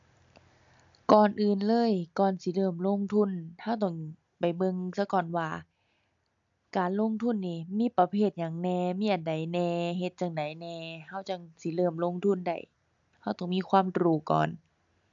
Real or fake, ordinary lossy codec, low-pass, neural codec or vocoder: real; AAC, 64 kbps; 7.2 kHz; none